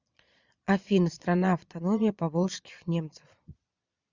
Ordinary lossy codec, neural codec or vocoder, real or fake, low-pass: Opus, 64 kbps; vocoder, 22.05 kHz, 80 mel bands, Vocos; fake; 7.2 kHz